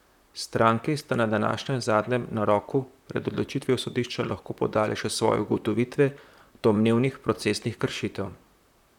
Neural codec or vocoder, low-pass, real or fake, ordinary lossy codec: vocoder, 44.1 kHz, 128 mel bands, Pupu-Vocoder; 19.8 kHz; fake; none